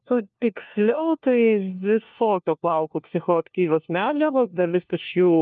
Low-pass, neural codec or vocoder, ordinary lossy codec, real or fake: 7.2 kHz; codec, 16 kHz, 1 kbps, FunCodec, trained on LibriTTS, 50 frames a second; Opus, 24 kbps; fake